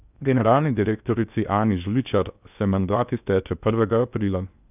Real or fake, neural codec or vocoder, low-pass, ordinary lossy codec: fake; codec, 16 kHz in and 24 kHz out, 0.8 kbps, FocalCodec, streaming, 65536 codes; 3.6 kHz; none